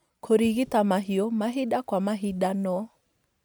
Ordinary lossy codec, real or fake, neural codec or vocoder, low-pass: none; real; none; none